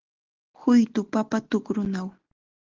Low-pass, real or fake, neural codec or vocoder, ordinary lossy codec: 7.2 kHz; real; none; Opus, 16 kbps